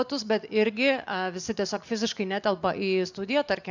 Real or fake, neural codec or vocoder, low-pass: real; none; 7.2 kHz